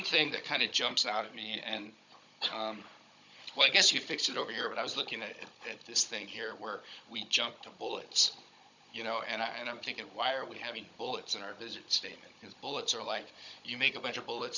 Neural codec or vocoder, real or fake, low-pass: codec, 16 kHz, 16 kbps, FunCodec, trained on Chinese and English, 50 frames a second; fake; 7.2 kHz